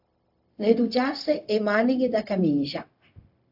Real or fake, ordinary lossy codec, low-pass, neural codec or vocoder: fake; AAC, 48 kbps; 5.4 kHz; codec, 16 kHz, 0.4 kbps, LongCat-Audio-Codec